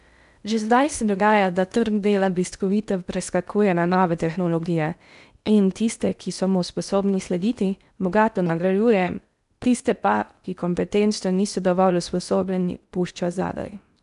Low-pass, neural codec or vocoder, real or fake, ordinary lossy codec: 10.8 kHz; codec, 16 kHz in and 24 kHz out, 0.6 kbps, FocalCodec, streaming, 2048 codes; fake; none